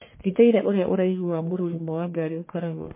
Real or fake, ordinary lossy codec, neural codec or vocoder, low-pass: fake; MP3, 24 kbps; codec, 44.1 kHz, 1.7 kbps, Pupu-Codec; 3.6 kHz